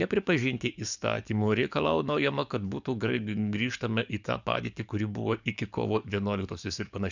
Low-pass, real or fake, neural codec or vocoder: 7.2 kHz; fake; codec, 44.1 kHz, 7.8 kbps, DAC